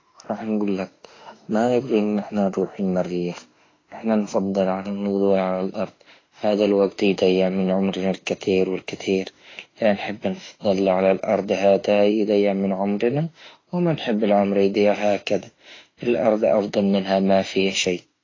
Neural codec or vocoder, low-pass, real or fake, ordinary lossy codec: autoencoder, 48 kHz, 32 numbers a frame, DAC-VAE, trained on Japanese speech; 7.2 kHz; fake; AAC, 32 kbps